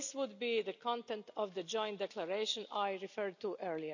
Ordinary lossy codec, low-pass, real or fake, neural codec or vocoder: none; 7.2 kHz; real; none